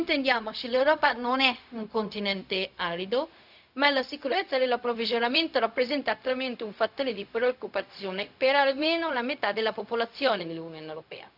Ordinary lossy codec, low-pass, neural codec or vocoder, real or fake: none; 5.4 kHz; codec, 16 kHz, 0.4 kbps, LongCat-Audio-Codec; fake